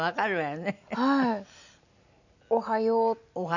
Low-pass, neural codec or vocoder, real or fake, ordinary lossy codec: 7.2 kHz; none; real; none